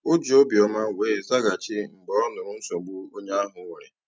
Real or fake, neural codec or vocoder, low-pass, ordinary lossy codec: real; none; none; none